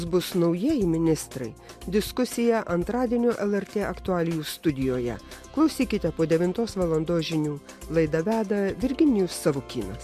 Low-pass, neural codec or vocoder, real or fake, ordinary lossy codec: 14.4 kHz; none; real; MP3, 64 kbps